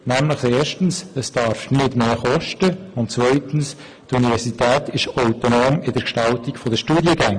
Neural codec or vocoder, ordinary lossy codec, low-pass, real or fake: none; MP3, 64 kbps; 9.9 kHz; real